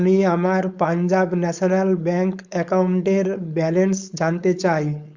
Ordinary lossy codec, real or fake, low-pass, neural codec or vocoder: Opus, 64 kbps; fake; 7.2 kHz; codec, 16 kHz, 4.8 kbps, FACodec